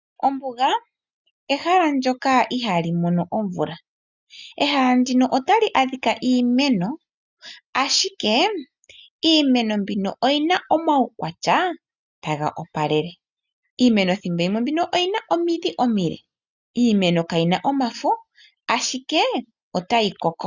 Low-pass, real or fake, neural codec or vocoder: 7.2 kHz; real; none